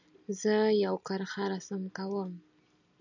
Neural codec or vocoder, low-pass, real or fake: none; 7.2 kHz; real